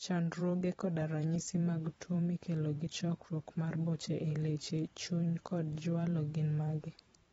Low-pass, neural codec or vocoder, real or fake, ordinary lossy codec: 19.8 kHz; vocoder, 44.1 kHz, 128 mel bands every 512 samples, BigVGAN v2; fake; AAC, 24 kbps